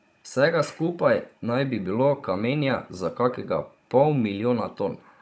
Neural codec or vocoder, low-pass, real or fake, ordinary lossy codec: codec, 16 kHz, 16 kbps, FunCodec, trained on Chinese and English, 50 frames a second; none; fake; none